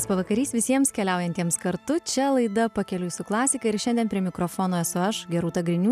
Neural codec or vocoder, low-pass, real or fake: none; 14.4 kHz; real